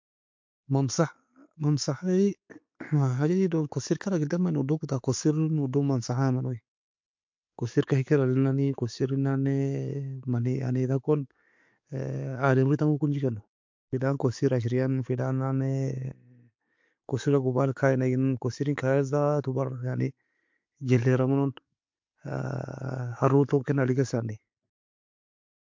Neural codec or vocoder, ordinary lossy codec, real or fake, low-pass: none; MP3, 48 kbps; real; 7.2 kHz